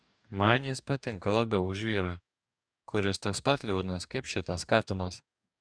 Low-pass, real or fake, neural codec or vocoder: 9.9 kHz; fake; codec, 44.1 kHz, 2.6 kbps, DAC